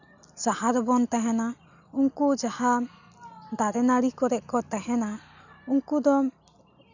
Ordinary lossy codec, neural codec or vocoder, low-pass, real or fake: none; none; 7.2 kHz; real